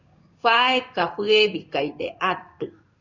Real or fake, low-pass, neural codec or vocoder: fake; 7.2 kHz; codec, 16 kHz in and 24 kHz out, 1 kbps, XY-Tokenizer